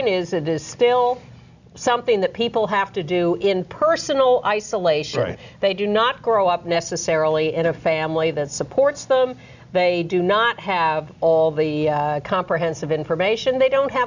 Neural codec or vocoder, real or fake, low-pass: none; real; 7.2 kHz